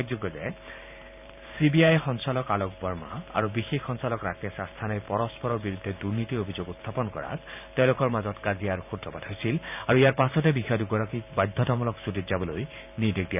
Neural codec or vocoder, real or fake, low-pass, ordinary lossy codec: vocoder, 44.1 kHz, 128 mel bands every 512 samples, BigVGAN v2; fake; 3.6 kHz; none